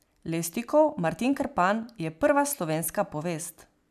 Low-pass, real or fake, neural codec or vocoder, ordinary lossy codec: 14.4 kHz; real; none; none